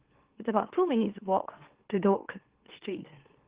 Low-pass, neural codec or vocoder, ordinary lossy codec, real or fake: 3.6 kHz; autoencoder, 44.1 kHz, a latent of 192 numbers a frame, MeloTTS; Opus, 16 kbps; fake